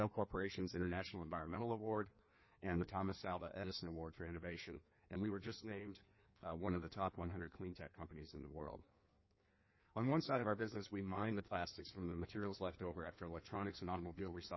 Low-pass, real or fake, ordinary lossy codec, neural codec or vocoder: 7.2 kHz; fake; MP3, 24 kbps; codec, 16 kHz in and 24 kHz out, 1.1 kbps, FireRedTTS-2 codec